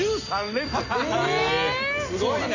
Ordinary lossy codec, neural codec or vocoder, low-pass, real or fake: none; none; 7.2 kHz; real